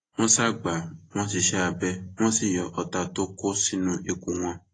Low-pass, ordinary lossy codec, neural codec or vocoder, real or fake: 9.9 kHz; AAC, 32 kbps; none; real